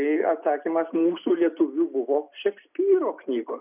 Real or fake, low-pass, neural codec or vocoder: real; 3.6 kHz; none